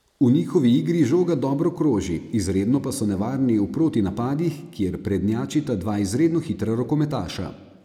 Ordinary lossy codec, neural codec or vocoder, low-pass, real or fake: none; none; 19.8 kHz; real